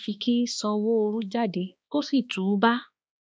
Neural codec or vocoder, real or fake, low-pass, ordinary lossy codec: codec, 16 kHz, 2 kbps, X-Codec, HuBERT features, trained on balanced general audio; fake; none; none